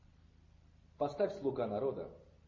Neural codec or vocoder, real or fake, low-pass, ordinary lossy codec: none; real; 7.2 kHz; MP3, 32 kbps